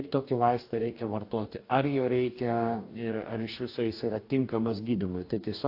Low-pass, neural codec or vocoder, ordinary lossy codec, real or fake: 5.4 kHz; codec, 44.1 kHz, 2.6 kbps, DAC; AAC, 32 kbps; fake